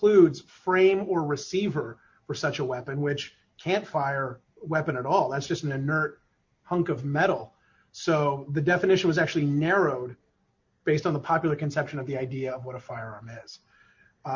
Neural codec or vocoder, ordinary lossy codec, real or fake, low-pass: none; MP3, 48 kbps; real; 7.2 kHz